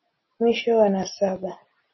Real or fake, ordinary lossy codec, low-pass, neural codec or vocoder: real; MP3, 24 kbps; 7.2 kHz; none